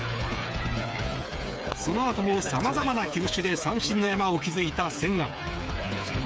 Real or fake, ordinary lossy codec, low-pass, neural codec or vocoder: fake; none; none; codec, 16 kHz, 8 kbps, FreqCodec, smaller model